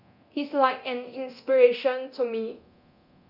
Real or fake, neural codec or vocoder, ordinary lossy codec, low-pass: fake; codec, 24 kHz, 0.9 kbps, DualCodec; none; 5.4 kHz